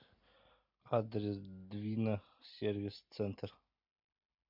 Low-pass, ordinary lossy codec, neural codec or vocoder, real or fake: 5.4 kHz; MP3, 48 kbps; none; real